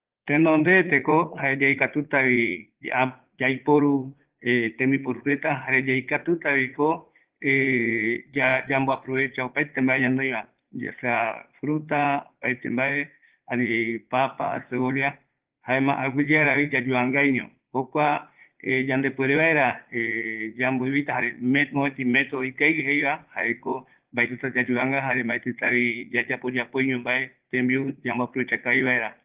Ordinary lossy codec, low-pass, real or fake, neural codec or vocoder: Opus, 16 kbps; 3.6 kHz; fake; vocoder, 44.1 kHz, 80 mel bands, Vocos